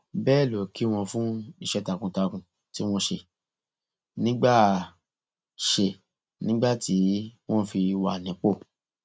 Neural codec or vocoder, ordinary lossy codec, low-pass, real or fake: none; none; none; real